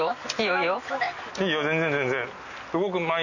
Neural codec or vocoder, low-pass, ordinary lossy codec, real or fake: none; 7.2 kHz; none; real